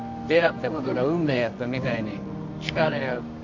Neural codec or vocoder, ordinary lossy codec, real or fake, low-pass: codec, 24 kHz, 0.9 kbps, WavTokenizer, medium music audio release; MP3, 48 kbps; fake; 7.2 kHz